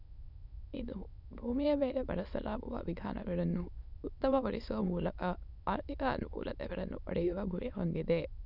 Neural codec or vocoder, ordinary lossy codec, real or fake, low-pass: autoencoder, 22.05 kHz, a latent of 192 numbers a frame, VITS, trained on many speakers; none; fake; 5.4 kHz